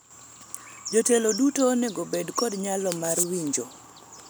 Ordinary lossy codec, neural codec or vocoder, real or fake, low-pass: none; none; real; none